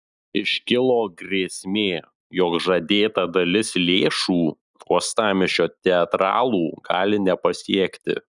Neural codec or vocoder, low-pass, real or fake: none; 10.8 kHz; real